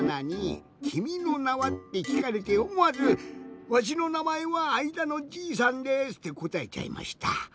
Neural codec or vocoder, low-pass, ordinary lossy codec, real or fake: none; none; none; real